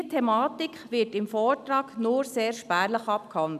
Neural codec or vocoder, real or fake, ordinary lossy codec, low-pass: none; real; none; 14.4 kHz